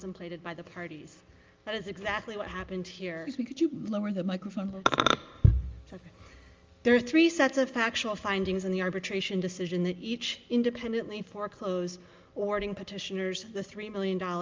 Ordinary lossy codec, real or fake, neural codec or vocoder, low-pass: Opus, 32 kbps; real; none; 7.2 kHz